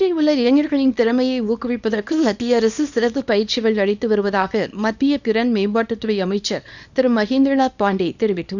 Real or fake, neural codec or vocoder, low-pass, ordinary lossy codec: fake; codec, 24 kHz, 0.9 kbps, WavTokenizer, small release; 7.2 kHz; none